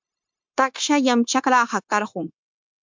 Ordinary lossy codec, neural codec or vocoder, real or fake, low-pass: MP3, 64 kbps; codec, 16 kHz, 0.9 kbps, LongCat-Audio-Codec; fake; 7.2 kHz